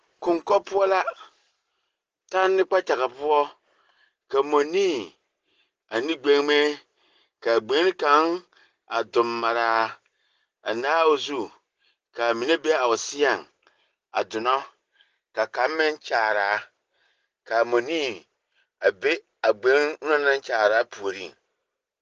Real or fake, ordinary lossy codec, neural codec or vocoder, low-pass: real; Opus, 16 kbps; none; 7.2 kHz